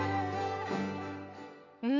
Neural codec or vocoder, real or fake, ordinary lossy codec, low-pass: none; real; none; 7.2 kHz